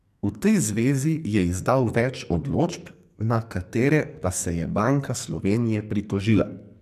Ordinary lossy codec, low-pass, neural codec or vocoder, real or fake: MP3, 96 kbps; 14.4 kHz; codec, 44.1 kHz, 2.6 kbps, SNAC; fake